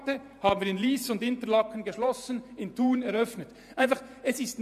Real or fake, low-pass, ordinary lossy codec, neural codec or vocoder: fake; 14.4 kHz; AAC, 96 kbps; vocoder, 48 kHz, 128 mel bands, Vocos